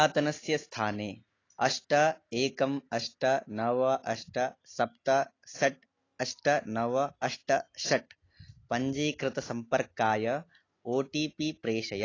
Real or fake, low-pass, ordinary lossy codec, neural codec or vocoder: real; 7.2 kHz; AAC, 32 kbps; none